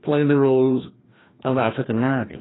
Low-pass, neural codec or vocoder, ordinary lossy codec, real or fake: 7.2 kHz; codec, 16 kHz, 1 kbps, FreqCodec, larger model; AAC, 16 kbps; fake